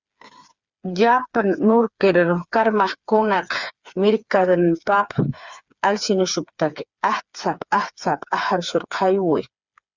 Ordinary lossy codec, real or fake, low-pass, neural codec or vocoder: Opus, 64 kbps; fake; 7.2 kHz; codec, 16 kHz, 4 kbps, FreqCodec, smaller model